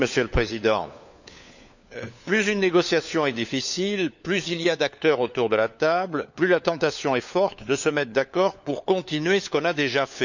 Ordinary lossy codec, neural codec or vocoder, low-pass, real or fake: none; codec, 16 kHz, 4 kbps, FunCodec, trained on LibriTTS, 50 frames a second; 7.2 kHz; fake